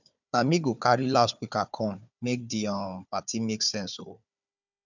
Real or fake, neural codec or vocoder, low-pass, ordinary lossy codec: fake; codec, 16 kHz, 4 kbps, FunCodec, trained on Chinese and English, 50 frames a second; 7.2 kHz; none